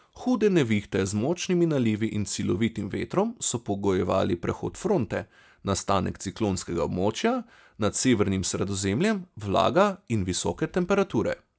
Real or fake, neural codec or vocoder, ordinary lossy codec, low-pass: real; none; none; none